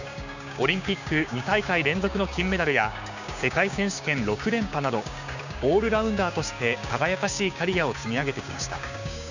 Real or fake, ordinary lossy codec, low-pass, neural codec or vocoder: fake; none; 7.2 kHz; codec, 16 kHz, 6 kbps, DAC